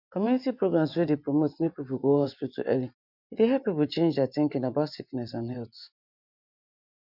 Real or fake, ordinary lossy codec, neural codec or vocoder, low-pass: fake; none; vocoder, 24 kHz, 100 mel bands, Vocos; 5.4 kHz